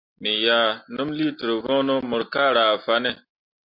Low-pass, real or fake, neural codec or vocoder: 5.4 kHz; real; none